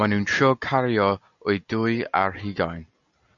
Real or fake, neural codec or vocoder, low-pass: real; none; 7.2 kHz